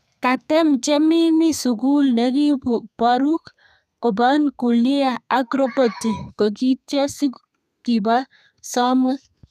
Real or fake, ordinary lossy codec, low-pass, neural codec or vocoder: fake; none; 14.4 kHz; codec, 32 kHz, 1.9 kbps, SNAC